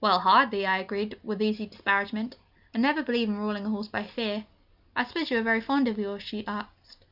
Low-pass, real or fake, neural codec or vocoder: 5.4 kHz; real; none